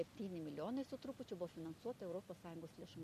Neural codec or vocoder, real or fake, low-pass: none; real; 14.4 kHz